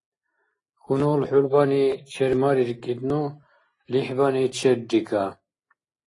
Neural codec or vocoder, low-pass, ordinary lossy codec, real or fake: none; 10.8 kHz; AAC, 32 kbps; real